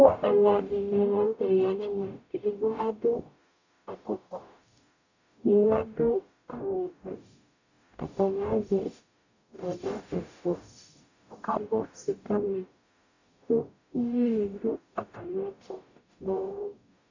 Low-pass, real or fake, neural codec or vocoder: 7.2 kHz; fake; codec, 44.1 kHz, 0.9 kbps, DAC